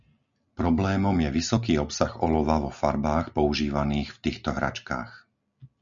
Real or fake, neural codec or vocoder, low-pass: real; none; 7.2 kHz